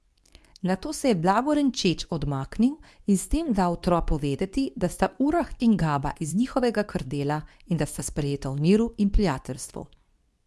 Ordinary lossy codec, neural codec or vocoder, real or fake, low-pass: none; codec, 24 kHz, 0.9 kbps, WavTokenizer, medium speech release version 2; fake; none